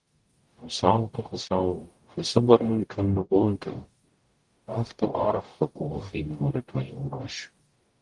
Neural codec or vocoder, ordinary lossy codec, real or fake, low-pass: codec, 44.1 kHz, 0.9 kbps, DAC; Opus, 24 kbps; fake; 10.8 kHz